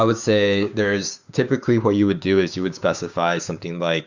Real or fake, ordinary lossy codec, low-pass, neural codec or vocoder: fake; Opus, 64 kbps; 7.2 kHz; codec, 16 kHz, 6 kbps, DAC